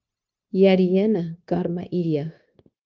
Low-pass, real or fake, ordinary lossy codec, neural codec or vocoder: 7.2 kHz; fake; Opus, 32 kbps; codec, 16 kHz, 0.9 kbps, LongCat-Audio-Codec